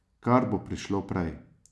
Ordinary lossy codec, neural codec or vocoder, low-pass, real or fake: none; none; none; real